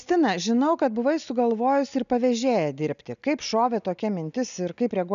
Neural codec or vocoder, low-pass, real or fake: none; 7.2 kHz; real